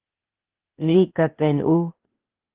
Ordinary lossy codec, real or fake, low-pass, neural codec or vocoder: Opus, 16 kbps; fake; 3.6 kHz; codec, 16 kHz, 0.8 kbps, ZipCodec